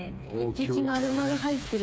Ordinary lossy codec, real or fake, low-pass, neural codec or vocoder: none; fake; none; codec, 16 kHz, 4 kbps, FreqCodec, smaller model